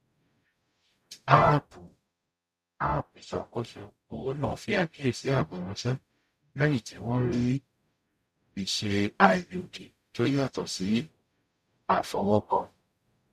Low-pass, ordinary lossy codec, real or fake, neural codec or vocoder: 14.4 kHz; none; fake; codec, 44.1 kHz, 0.9 kbps, DAC